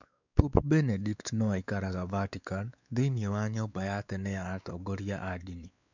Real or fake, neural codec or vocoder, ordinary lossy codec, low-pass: fake; codec, 16 kHz, 4 kbps, X-Codec, WavLM features, trained on Multilingual LibriSpeech; none; 7.2 kHz